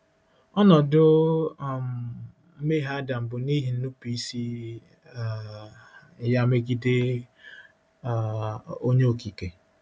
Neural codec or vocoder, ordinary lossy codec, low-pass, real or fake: none; none; none; real